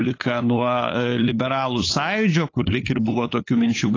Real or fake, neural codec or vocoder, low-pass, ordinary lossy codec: fake; codec, 16 kHz, 8 kbps, FunCodec, trained on LibriTTS, 25 frames a second; 7.2 kHz; AAC, 32 kbps